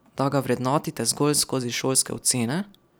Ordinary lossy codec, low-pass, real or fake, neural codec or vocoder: none; none; real; none